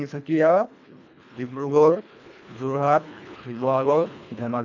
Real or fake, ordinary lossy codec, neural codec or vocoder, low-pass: fake; none; codec, 24 kHz, 1.5 kbps, HILCodec; 7.2 kHz